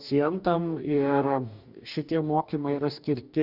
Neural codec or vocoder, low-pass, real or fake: codec, 44.1 kHz, 2.6 kbps, DAC; 5.4 kHz; fake